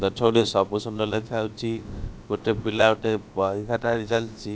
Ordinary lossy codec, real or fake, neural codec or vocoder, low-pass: none; fake; codec, 16 kHz, about 1 kbps, DyCAST, with the encoder's durations; none